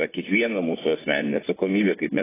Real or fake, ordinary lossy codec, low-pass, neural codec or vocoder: fake; AAC, 16 kbps; 3.6 kHz; vocoder, 24 kHz, 100 mel bands, Vocos